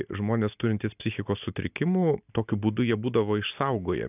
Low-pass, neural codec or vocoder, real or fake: 3.6 kHz; none; real